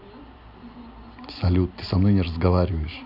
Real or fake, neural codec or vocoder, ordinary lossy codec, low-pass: real; none; none; 5.4 kHz